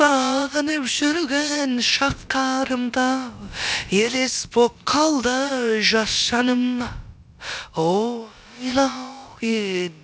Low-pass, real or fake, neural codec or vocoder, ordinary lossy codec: none; fake; codec, 16 kHz, about 1 kbps, DyCAST, with the encoder's durations; none